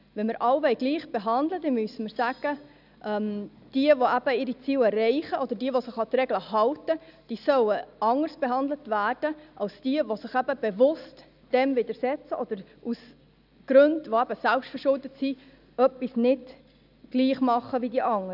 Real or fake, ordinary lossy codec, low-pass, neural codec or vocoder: real; none; 5.4 kHz; none